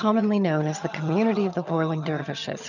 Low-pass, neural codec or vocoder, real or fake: 7.2 kHz; vocoder, 22.05 kHz, 80 mel bands, HiFi-GAN; fake